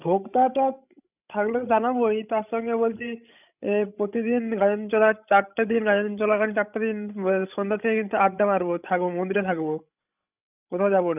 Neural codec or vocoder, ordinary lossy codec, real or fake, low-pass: codec, 16 kHz, 16 kbps, FreqCodec, larger model; none; fake; 3.6 kHz